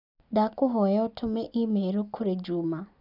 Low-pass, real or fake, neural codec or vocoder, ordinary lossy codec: 5.4 kHz; real; none; none